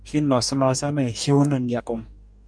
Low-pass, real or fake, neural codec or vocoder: 9.9 kHz; fake; codec, 44.1 kHz, 2.6 kbps, DAC